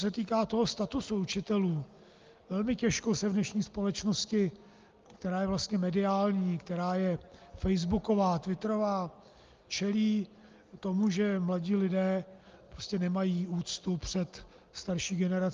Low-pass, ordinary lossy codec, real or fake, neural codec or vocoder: 7.2 kHz; Opus, 32 kbps; real; none